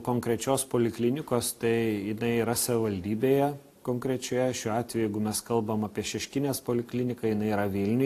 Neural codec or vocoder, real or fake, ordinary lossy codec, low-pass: none; real; AAC, 64 kbps; 14.4 kHz